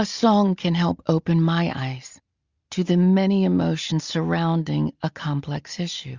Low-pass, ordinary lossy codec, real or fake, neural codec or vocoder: 7.2 kHz; Opus, 64 kbps; real; none